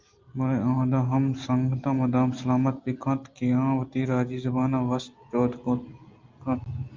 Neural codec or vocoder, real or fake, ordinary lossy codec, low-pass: none; real; Opus, 24 kbps; 7.2 kHz